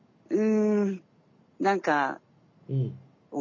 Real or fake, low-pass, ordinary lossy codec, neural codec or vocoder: real; 7.2 kHz; none; none